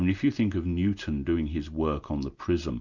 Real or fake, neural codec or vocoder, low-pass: real; none; 7.2 kHz